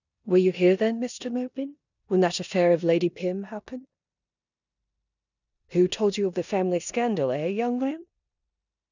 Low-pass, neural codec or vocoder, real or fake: 7.2 kHz; codec, 16 kHz in and 24 kHz out, 0.9 kbps, LongCat-Audio-Codec, four codebook decoder; fake